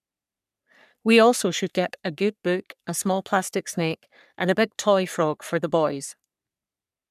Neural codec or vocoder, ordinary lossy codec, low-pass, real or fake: codec, 44.1 kHz, 3.4 kbps, Pupu-Codec; none; 14.4 kHz; fake